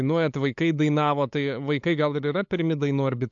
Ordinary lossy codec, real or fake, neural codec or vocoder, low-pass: AAC, 64 kbps; fake; codec, 16 kHz, 8 kbps, FunCodec, trained on LibriTTS, 25 frames a second; 7.2 kHz